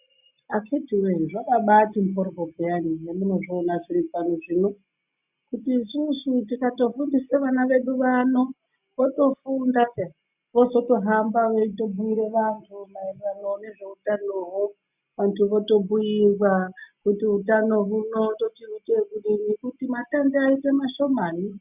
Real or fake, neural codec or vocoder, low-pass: real; none; 3.6 kHz